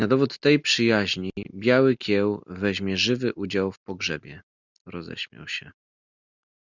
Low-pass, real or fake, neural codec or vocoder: 7.2 kHz; real; none